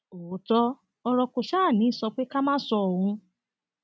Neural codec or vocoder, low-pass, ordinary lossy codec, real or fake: none; none; none; real